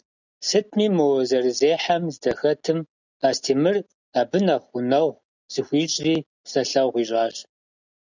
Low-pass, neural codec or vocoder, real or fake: 7.2 kHz; none; real